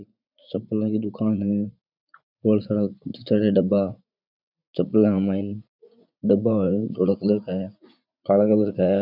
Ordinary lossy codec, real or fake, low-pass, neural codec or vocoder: none; fake; 5.4 kHz; vocoder, 44.1 kHz, 80 mel bands, Vocos